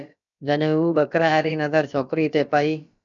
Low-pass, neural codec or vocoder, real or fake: 7.2 kHz; codec, 16 kHz, about 1 kbps, DyCAST, with the encoder's durations; fake